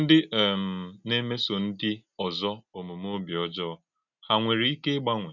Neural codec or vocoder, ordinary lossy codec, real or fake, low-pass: none; none; real; 7.2 kHz